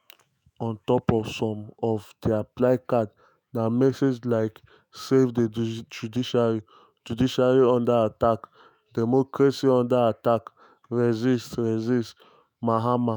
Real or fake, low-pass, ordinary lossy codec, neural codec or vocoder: fake; none; none; autoencoder, 48 kHz, 128 numbers a frame, DAC-VAE, trained on Japanese speech